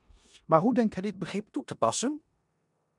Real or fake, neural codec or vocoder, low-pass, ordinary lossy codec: fake; codec, 16 kHz in and 24 kHz out, 0.9 kbps, LongCat-Audio-Codec, four codebook decoder; 10.8 kHz; MP3, 96 kbps